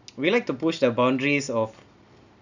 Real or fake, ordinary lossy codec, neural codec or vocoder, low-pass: real; none; none; 7.2 kHz